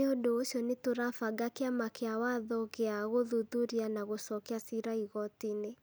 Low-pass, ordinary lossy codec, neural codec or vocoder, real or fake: none; none; none; real